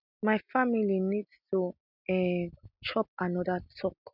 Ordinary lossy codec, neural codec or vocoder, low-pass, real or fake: none; none; 5.4 kHz; real